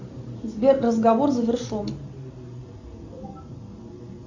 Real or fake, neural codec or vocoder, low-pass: real; none; 7.2 kHz